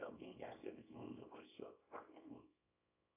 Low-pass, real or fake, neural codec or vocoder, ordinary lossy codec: 3.6 kHz; fake; codec, 24 kHz, 0.9 kbps, WavTokenizer, small release; AAC, 32 kbps